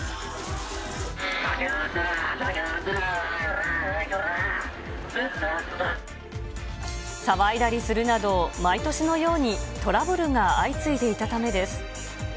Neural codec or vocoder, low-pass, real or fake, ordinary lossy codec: none; none; real; none